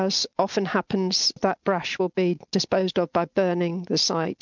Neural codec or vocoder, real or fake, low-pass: none; real; 7.2 kHz